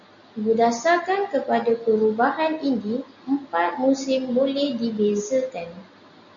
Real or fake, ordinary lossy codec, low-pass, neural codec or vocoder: real; MP3, 96 kbps; 7.2 kHz; none